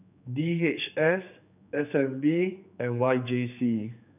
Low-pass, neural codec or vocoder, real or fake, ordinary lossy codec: 3.6 kHz; codec, 16 kHz, 4 kbps, X-Codec, HuBERT features, trained on general audio; fake; none